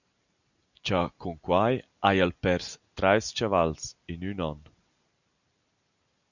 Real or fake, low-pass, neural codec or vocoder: real; 7.2 kHz; none